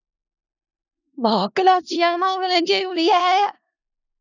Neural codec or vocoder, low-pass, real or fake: codec, 16 kHz in and 24 kHz out, 0.4 kbps, LongCat-Audio-Codec, four codebook decoder; 7.2 kHz; fake